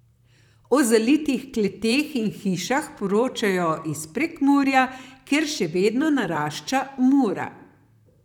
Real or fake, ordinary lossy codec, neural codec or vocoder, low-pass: fake; none; vocoder, 44.1 kHz, 128 mel bands, Pupu-Vocoder; 19.8 kHz